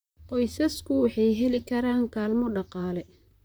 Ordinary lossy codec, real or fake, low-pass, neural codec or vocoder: none; fake; none; vocoder, 44.1 kHz, 128 mel bands, Pupu-Vocoder